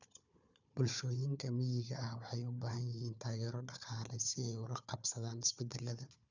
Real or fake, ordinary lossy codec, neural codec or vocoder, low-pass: fake; none; codec, 16 kHz, 4 kbps, FunCodec, trained on Chinese and English, 50 frames a second; 7.2 kHz